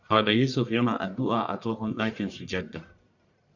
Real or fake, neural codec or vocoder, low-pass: fake; codec, 44.1 kHz, 1.7 kbps, Pupu-Codec; 7.2 kHz